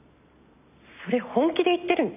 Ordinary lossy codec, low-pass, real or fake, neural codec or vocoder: none; 3.6 kHz; real; none